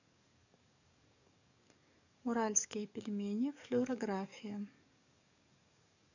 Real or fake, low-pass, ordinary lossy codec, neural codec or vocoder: fake; 7.2 kHz; none; codec, 44.1 kHz, 7.8 kbps, DAC